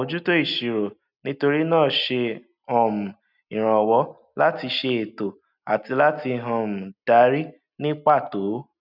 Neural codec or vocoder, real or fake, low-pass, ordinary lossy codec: none; real; 5.4 kHz; none